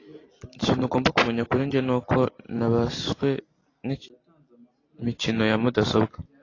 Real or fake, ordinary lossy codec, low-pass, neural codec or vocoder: real; AAC, 32 kbps; 7.2 kHz; none